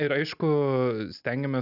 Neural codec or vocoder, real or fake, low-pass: none; real; 5.4 kHz